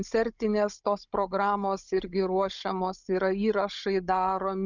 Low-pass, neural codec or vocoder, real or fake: 7.2 kHz; codec, 16 kHz, 16 kbps, FunCodec, trained on Chinese and English, 50 frames a second; fake